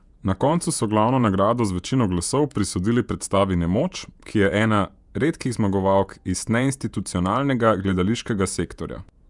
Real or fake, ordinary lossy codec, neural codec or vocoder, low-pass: fake; none; vocoder, 48 kHz, 128 mel bands, Vocos; 10.8 kHz